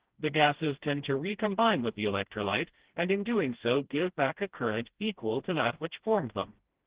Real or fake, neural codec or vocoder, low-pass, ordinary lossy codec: fake; codec, 16 kHz, 1 kbps, FreqCodec, smaller model; 3.6 kHz; Opus, 16 kbps